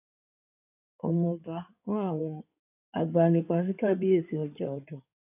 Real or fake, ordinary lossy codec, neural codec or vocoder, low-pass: fake; none; codec, 16 kHz in and 24 kHz out, 2.2 kbps, FireRedTTS-2 codec; 3.6 kHz